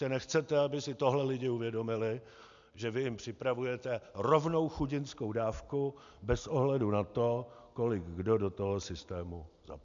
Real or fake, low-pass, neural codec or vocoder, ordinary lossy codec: real; 7.2 kHz; none; MP3, 64 kbps